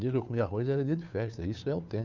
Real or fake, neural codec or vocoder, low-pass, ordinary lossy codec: fake; codec, 16 kHz, 2 kbps, FunCodec, trained on Chinese and English, 25 frames a second; 7.2 kHz; none